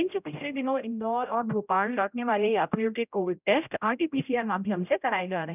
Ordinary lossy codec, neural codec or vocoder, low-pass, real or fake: none; codec, 16 kHz, 0.5 kbps, X-Codec, HuBERT features, trained on general audio; 3.6 kHz; fake